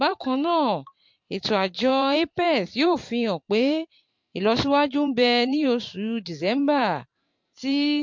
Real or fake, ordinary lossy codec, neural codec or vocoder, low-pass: fake; MP3, 48 kbps; vocoder, 44.1 kHz, 128 mel bands every 512 samples, BigVGAN v2; 7.2 kHz